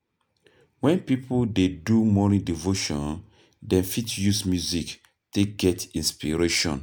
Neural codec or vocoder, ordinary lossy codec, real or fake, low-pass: none; none; real; none